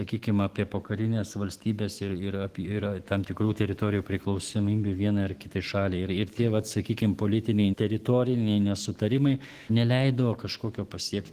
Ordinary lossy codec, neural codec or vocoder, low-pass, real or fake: Opus, 16 kbps; autoencoder, 48 kHz, 128 numbers a frame, DAC-VAE, trained on Japanese speech; 14.4 kHz; fake